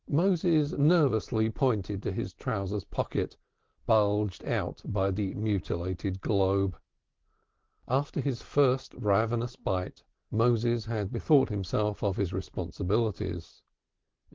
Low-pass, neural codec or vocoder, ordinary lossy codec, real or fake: 7.2 kHz; none; Opus, 16 kbps; real